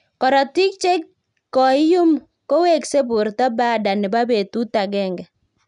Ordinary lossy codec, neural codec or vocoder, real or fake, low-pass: none; none; real; 10.8 kHz